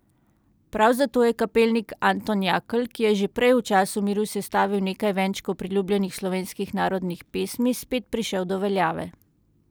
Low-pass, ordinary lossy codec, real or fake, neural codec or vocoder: none; none; real; none